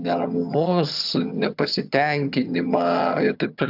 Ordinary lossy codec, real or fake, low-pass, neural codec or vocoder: AAC, 48 kbps; fake; 5.4 kHz; vocoder, 22.05 kHz, 80 mel bands, HiFi-GAN